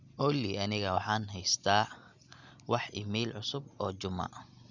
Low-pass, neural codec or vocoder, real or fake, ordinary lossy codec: 7.2 kHz; none; real; none